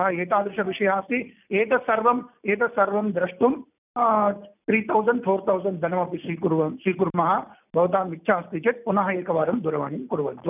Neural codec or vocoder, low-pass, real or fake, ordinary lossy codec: none; 3.6 kHz; real; none